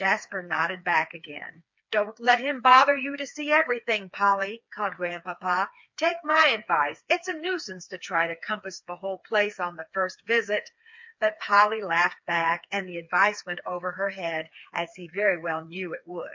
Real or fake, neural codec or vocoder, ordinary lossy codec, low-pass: fake; codec, 16 kHz, 4 kbps, FreqCodec, smaller model; MP3, 48 kbps; 7.2 kHz